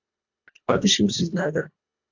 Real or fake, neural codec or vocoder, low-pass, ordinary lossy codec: fake; codec, 24 kHz, 1.5 kbps, HILCodec; 7.2 kHz; MP3, 64 kbps